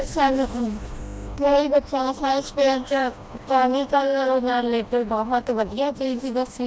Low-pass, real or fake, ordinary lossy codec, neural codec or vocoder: none; fake; none; codec, 16 kHz, 1 kbps, FreqCodec, smaller model